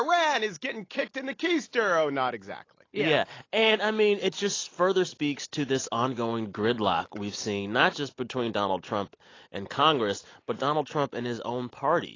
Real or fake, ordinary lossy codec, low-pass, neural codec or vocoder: real; AAC, 32 kbps; 7.2 kHz; none